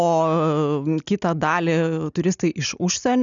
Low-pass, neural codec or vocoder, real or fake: 7.2 kHz; none; real